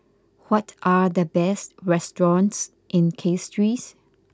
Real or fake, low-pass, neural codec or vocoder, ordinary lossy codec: real; none; none; none